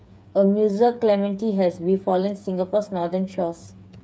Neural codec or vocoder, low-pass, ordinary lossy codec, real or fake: codec, 16 kHz, 8 kbps, FreqCodec, smaller model; none; none; fake